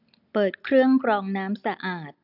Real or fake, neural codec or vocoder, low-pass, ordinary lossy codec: real; none; 5.4 kHz; none